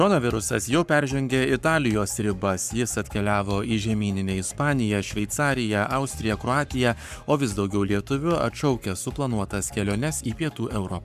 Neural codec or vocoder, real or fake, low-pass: codec, 44.1 kHz, 7.8 kbps, Pupu-Codec; fake; 14.4 kHz